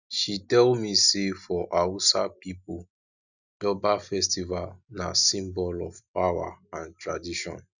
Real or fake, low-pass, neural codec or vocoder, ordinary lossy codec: real; 7.2 kHz; none; none